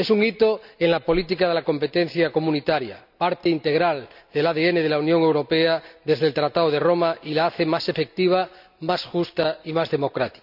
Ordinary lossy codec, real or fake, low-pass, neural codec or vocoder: none; real; 5.4 kHz; none